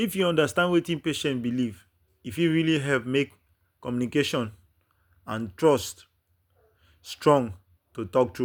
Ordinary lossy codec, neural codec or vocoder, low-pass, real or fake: none; none; none; real